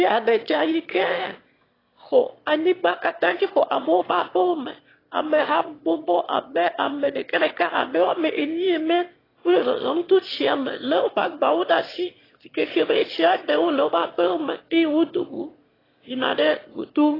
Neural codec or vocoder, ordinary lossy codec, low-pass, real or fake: autoencoder, 22.05 kHz, a latent of 192 numbers a frame, VITS, trained on one speaker; AAC, 24 kbps; 5.4 kHz; fake